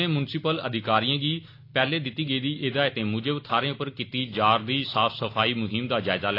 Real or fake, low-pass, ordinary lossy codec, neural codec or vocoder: real; 5.4 kHz; AAC, 32 kbps; none